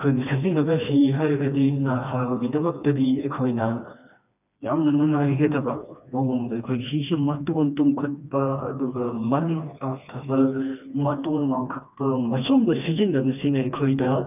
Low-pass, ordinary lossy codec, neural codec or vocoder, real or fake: 3.6 kHz; none; codec, 16 kHz, 2 kbps, FreqCodec, smaller model; fake